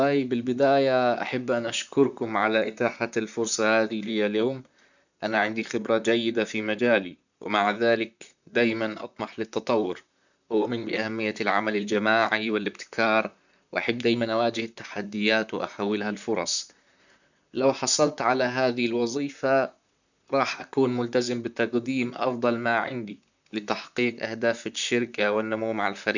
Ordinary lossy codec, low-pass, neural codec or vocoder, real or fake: none; 7.2 kHz; vocoder, 44.1 kHz, 128 mel bands, Pupu-Vocoder; fake